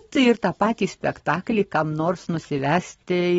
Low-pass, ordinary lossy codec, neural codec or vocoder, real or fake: 19.8 kHz; AAC, 24 kbps; none; real